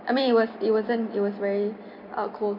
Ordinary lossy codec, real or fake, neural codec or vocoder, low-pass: none; fake; vocoder, 44.1 kHz, 128 mel bands every 256 samples, BigVGAN v2; 5.4 kHz